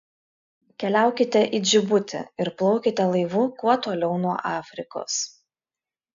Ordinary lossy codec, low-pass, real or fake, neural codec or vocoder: AAC, 96 kbps; 7.2 kHz; real; none